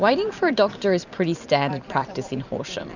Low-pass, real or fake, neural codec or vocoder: 7.2 kHz; fake; vocoder, 44.1 kHz, 128 mel bands every 256 samples, BigVGAN v2